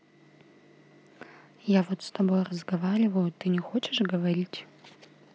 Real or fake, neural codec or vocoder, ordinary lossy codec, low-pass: real; none; none; none